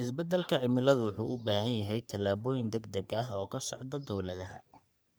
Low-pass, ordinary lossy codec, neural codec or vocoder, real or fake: none; none; codec, 44.1 kHz, 3.4 kbps, Pupu-Codec; fake